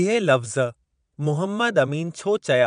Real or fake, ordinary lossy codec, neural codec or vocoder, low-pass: fake; none; vocoder, 22.05 kHz, 80 mel bands, Vocos; 9.9 kHz